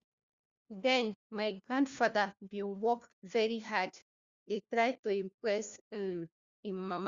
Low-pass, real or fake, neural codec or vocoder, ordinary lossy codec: 7.2 kHz; fake; codec, 16 kHz, 1 kbps, FunCodec, trained on LibriTTS, 50 frames a second; Opus, 64 kbps